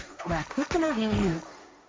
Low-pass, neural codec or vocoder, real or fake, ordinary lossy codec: none; codec, 16 kHz, 1.1 kbps, Voila-Tokenizer; fake; none